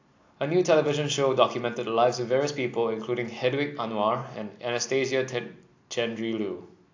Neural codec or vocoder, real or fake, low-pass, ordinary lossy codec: none; real; 7.2 kHz; AAC, 48 kbps